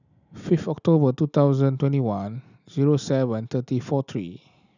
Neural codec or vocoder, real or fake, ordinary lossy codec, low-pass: none; real; none; 7.2 kHz